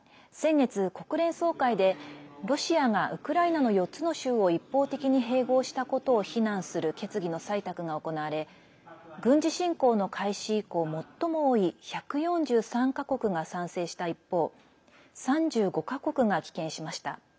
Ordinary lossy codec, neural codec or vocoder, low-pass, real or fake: none; none; none; real